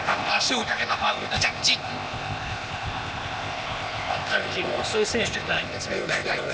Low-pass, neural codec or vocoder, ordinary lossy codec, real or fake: none; codec, 16 kHz, 0.8 kbps, ZipCodec; none; fake